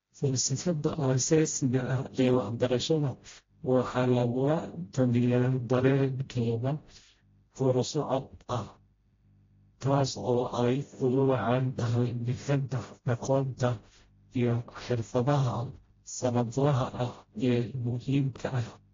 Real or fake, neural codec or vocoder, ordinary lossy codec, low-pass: fake; codec, 16 kHz, 0.5 kbps, FreqCodec, smaller model; AAC, 32 kbps; 7.2 kHz